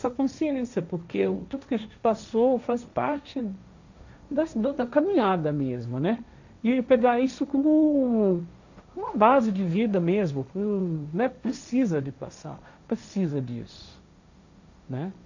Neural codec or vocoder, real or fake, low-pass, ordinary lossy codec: codec, 16 kHz, 1.1 kbps, Voila-Tokenizer; fake; 7.2 kHz; none